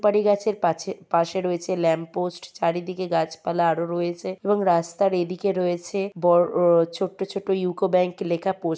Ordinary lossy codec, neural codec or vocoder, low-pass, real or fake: none; none; none; real